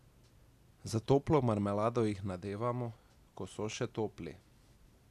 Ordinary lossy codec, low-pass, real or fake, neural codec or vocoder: none; 14.4 kHz; real; none